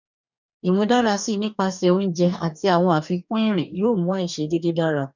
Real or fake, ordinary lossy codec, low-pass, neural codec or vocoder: fake; none; 7.2 kHz; codec, 44.1 kHz, 2.6 kbps, DAC